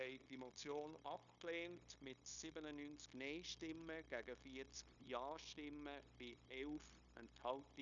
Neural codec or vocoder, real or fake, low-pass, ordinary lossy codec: codec, 16 kHz, 0.9 kbps, LongCat-Audio-Codec; fake; 7.2 kHz; none